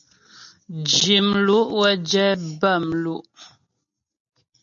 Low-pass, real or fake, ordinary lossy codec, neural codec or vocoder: 7.2 kHz; real; AAC, 64 kbps; none